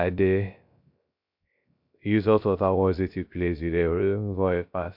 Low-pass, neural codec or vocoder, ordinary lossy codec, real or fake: 5.4 kHz; codec, 16 kHz, 0.3 kbps, FocalCodec; MP3, 48 kbps; fake